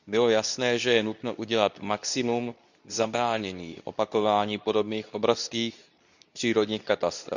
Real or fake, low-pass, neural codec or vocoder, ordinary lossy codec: fake; 7.2 kHz; codec, 24 kHz, 0.9 kbps, WavTokenizer, medium speech release version 2; none